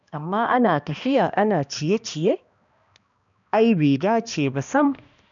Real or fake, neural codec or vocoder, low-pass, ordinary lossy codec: fake; codec, 16 kHz, 1 kbps, X-Codec, HuBERT features, trained on balanced general audio; 7.2 kHz; none